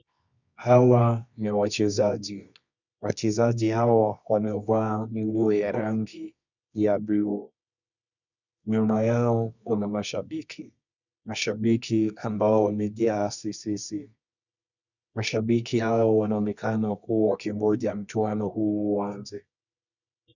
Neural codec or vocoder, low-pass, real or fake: codec, 24 kHz, 0.9 kbps, WavTokenizer, medium music audio release; 7.2 kHz; fake